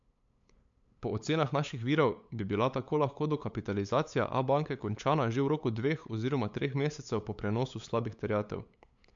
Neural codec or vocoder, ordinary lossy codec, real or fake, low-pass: codec, 16 kHz, 8 kbps, FunCodec, trained on LibriTTS, 25 frames a second; MP3, 64 kbps; fake; 7.2 kHz